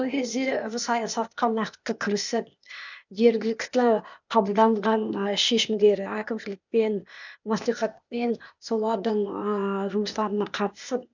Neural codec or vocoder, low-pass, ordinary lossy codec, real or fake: codec, 16 kHz, 0.8 kbps, ZipCodec; 7.2 kHz; none; fake